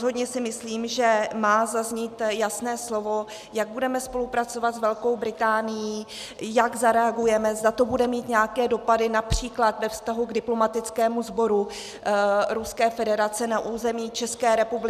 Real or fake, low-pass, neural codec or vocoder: real; 14.4 kHz; none